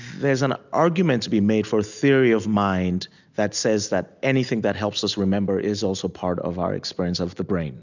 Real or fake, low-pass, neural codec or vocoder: real; 7.2 kHz; none